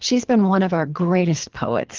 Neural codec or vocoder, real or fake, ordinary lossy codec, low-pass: codec, 24 kHz, 3 kbps, HILCodec; fake; Opus, 16 kbps; 7.2 kHz